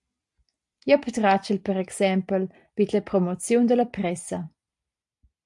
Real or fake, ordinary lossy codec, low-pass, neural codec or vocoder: real; AAC, 64 kbps; 10.8 kHz; none